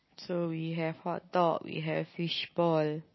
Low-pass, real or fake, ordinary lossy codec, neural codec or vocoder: 7.2 kHz; real; MP3, 24 kbps; none